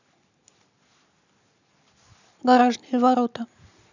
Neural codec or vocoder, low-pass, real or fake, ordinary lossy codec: none; 7.2 kHz; real; none